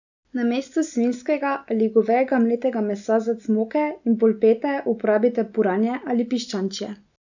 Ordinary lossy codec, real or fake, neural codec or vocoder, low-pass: none; real; none; 7.2 kHz